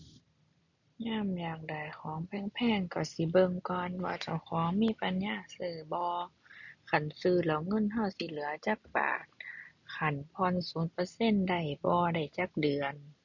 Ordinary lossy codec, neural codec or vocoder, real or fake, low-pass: none; none; real; 7.2 kHz